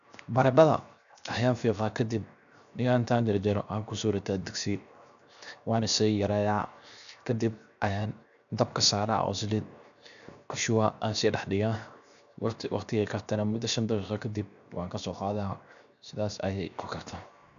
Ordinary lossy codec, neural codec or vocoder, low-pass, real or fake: none; codec, 16 kHz, 0.7 kbps, FocalCodec; 7.2 kHz; fake